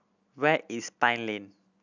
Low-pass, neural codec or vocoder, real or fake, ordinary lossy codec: 7.2 kHz; none; real; none